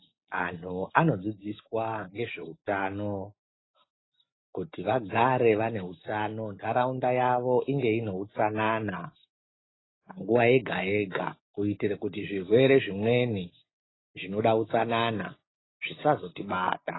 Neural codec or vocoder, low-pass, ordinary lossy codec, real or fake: none; 7.2 kHz; AAC, 16 kbps; real